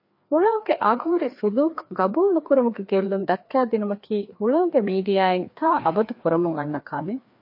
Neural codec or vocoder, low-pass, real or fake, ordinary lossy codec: codec, 16 kHz, 2 kbps, FreqCodec, larger model; 5.4 kHz; fake; MP3, 32 kbps